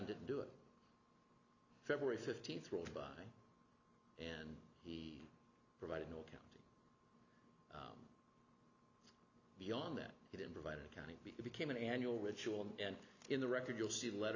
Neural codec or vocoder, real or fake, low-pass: none; real; 7.2 kHz